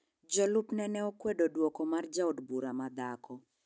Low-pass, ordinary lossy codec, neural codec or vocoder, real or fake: none; none; none; real